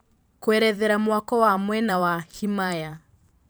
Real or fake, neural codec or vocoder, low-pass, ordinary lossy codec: fake; vocoder, 44.1 kHz, 128 mel bands every 512 samples, BigVGAN v2; none; none